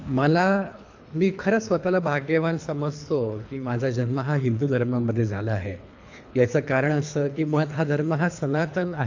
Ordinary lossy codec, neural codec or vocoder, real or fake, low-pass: AAC, 48 kbps; codec, 24 kHz, 3 kbps, HILCodec; fake; 7.2 kHz